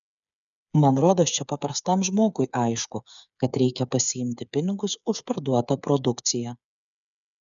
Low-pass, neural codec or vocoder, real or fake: 7.2 kHz; codec, 16 kHz, 8 kbps, FreqCodec, smaller model; fake